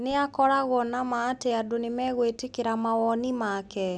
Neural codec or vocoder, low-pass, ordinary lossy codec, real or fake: none; none; none; real